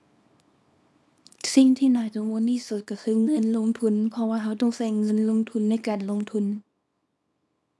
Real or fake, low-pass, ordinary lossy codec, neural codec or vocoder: fake; none; none; codec, 24 kHz, 0.9 kbps, WavTokenizer, small release